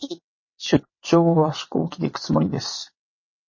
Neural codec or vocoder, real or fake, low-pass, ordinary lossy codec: vocoder, 22.05 kHz, 80 mel bands, WaveNeXt; fake; 7.2 kHz; MP3, 32 kbps